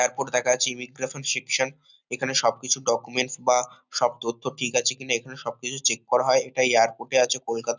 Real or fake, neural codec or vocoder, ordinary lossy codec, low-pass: real; none; none; 7.2 kHz